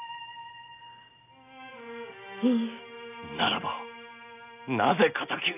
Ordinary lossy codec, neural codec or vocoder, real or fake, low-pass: none; none; real; 3.6 kHz